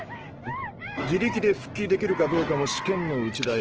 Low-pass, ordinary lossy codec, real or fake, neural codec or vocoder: 7.2 kHz; Opus, 16 kbps; fake; autoencoder, 48 kHz, 128 numbers a frame, DAC-VAE, trained on Japanese speech